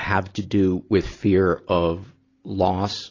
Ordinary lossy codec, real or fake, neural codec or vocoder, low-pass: AAC, 32 kbps; real; none; 7.2 kHz